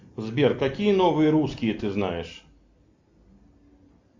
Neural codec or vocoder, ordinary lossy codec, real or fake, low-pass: none; MP3, 64 kbps; real; 7.2 kHz